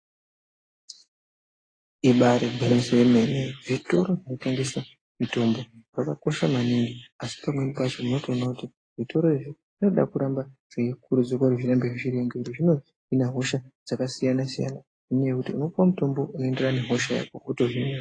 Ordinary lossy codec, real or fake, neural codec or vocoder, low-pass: AAC, 32 kbps; real; none; 9.9 kHz